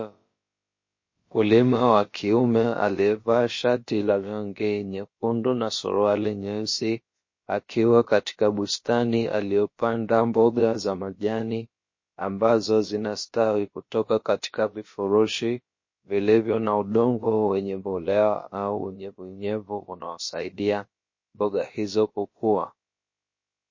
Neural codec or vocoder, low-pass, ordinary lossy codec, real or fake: codec, 16 kHz, about 1 kbps, DyCAST, with the encoder's durations; 7.2 kHz; MP3, 32 kbps; fake